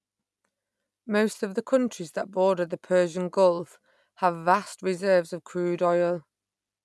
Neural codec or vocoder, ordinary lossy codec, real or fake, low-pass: none; none; real; none